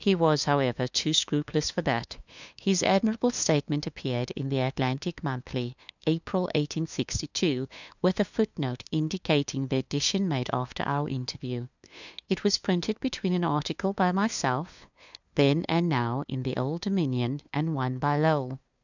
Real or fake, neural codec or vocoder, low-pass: fake; codec, 16 kHz, 2 kbps, FunCodec, trained on LibriTTS, 25 frames a second; 7.2 kHz